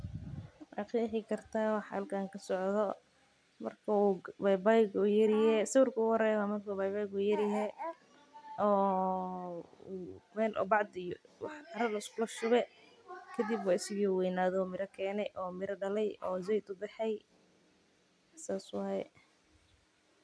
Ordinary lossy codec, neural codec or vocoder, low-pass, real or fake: none; none; none; real